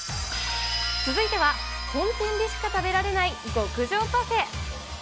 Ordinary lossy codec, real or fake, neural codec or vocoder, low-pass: none; real; none; none